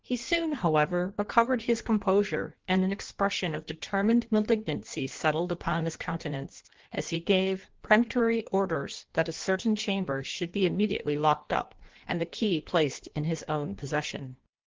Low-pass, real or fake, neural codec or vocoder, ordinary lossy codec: 7.2 kHz; fake; codec, 16 kHz in and 24 kHz out, 1.1 kbps, FireRedTTS-2 codec; Opus, 16 kbps